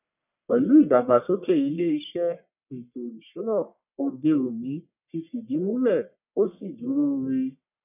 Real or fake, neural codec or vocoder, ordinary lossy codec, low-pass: fake; codec, 44.1 kHz, 1.7 kbps, Pupu-Codec; none; 3.6 kHz